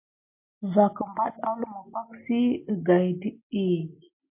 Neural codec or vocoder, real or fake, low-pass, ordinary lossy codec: none; real; 3.6 kHz; MP3, 24 kbps